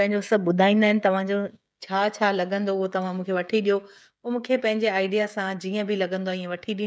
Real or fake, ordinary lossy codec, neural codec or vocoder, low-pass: fake; none; codec, 16 kHz, 16 kbps, FreqCodec, smaller model; none